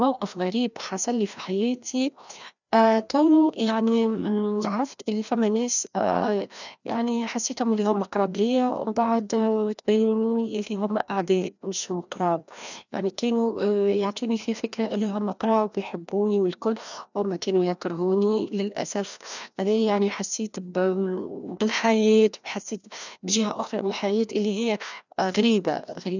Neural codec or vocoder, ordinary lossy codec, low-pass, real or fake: codec, 16 kHz, 1 kbps, FreqCodec, larger model; none; 7.2 kHz; fake